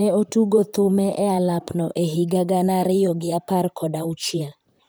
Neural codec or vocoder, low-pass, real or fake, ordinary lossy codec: vocoder, 44.1 kHz, 128 mel bands, Pupu-Vocoder; none; fake; none